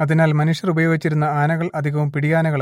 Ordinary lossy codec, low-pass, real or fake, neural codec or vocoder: MP3, 64 kbps; 19.8 kHz; real; none